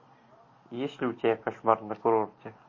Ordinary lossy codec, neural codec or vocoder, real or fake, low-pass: MP3, 32 kbps; none; real; 7.2 kHz